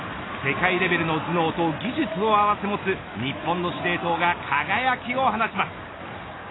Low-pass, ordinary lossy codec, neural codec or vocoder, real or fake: 7.2 kHz; AAC, 16 kbps; none; real